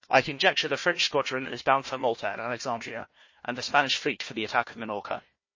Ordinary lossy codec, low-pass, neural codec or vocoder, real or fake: MP3, 32 kbps; 7.2 kHz; codec, 16 kHz, 1 kbps, FunCodec, trained on Chinese and English, 50 frames a second; fake